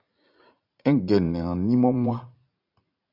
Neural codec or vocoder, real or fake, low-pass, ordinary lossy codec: vocoder, 44.1 kHz, 80 mel bands, Vocos; fake; 5.4 kHz; AAC, 32 kbps